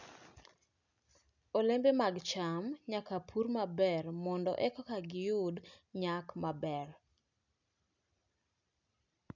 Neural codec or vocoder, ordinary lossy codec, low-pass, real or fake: none; none; 7.2 kHz; real